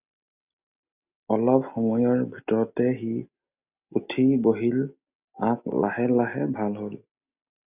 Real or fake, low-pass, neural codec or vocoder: real; 3.6 kHz; none